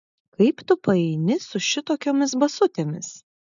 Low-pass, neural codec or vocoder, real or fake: 7.2 kHz; none; real